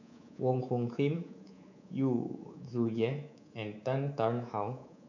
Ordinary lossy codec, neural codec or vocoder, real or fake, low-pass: none; codec, 24 kHz, 3.1 kbps, DualCodec; fake; 7.2 kHz